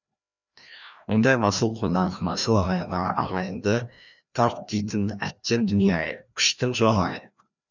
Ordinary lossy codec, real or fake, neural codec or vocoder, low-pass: none; fake; codec, 16 kHz, 1 kbps, FreqCodec, larger model; 7.2 kHz